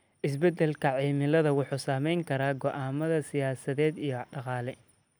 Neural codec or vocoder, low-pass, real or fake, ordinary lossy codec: none; none; real; none